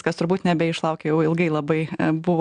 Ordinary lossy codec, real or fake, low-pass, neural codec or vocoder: MP3, 96 kbps; real; 9.9 kHz; none